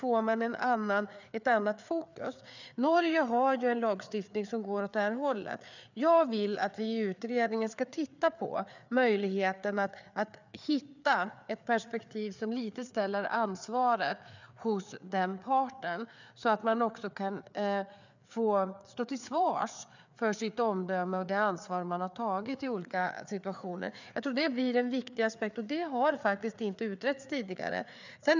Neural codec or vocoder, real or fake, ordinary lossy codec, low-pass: codec, 16 kHz, 4 kbps, FreqCodec, larger model; fake; none; 7.2 kHz